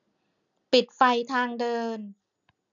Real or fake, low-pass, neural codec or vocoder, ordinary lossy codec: real; 7.2 kHz; none; none